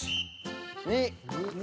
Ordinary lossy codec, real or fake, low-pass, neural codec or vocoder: none; real; none; none